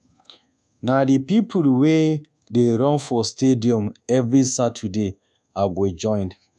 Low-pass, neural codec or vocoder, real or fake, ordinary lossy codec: none; codec, 24 kHz, 1.2 kbps, DualCodec; fake; none